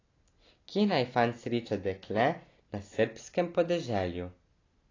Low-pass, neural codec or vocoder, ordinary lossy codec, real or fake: 7.2 kHz; none; AAC, 32 kbps; real